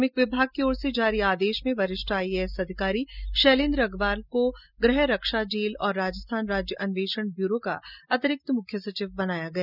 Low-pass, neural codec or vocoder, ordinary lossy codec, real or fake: 5.4 kHz; none; none; real